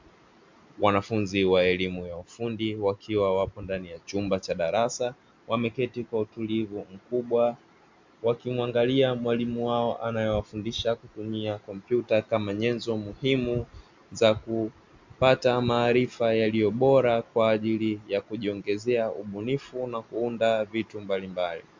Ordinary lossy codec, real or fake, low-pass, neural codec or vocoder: MP3, 64 kbps; real; 7.2 kHz; none